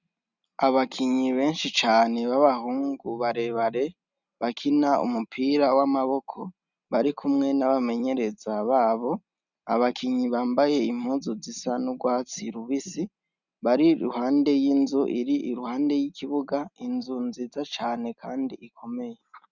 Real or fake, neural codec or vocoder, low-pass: real; none; 7.2 kHz